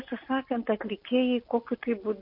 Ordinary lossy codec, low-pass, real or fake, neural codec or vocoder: MP3, 32 kbps; 5.4 kHz; real; none